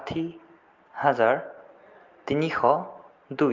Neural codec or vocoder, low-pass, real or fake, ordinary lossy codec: none; 7.2 kHz; real; Opus, 32 kbps